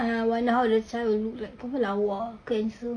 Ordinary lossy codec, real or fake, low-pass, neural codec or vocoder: none; real; 9.9 kHz; none